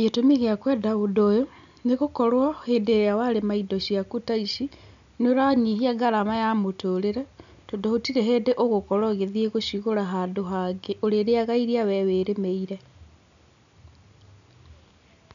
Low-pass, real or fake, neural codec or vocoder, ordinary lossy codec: 7.2 kHz; real; none; none